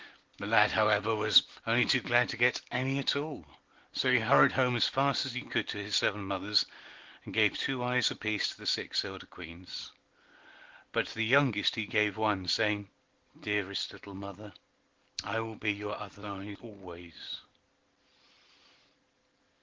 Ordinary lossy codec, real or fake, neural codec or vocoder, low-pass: Opus, 16 kbps; real; none; 7.2 kHz